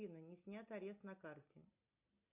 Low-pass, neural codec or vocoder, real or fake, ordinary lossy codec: 3.6 kHz; none; real; AAC, 24 kbps